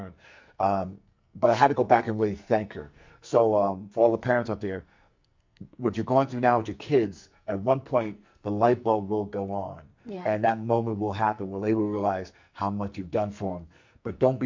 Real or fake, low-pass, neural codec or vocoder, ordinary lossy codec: fake; 7.2 kHz; codec, 44.1 kHz, 2.6 kbps, SNAC; MP3, 64 kbps